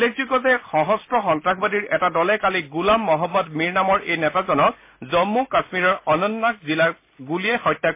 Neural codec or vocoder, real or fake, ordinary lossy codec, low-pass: none; real; MP3, 24 kbps; 3.6 kHz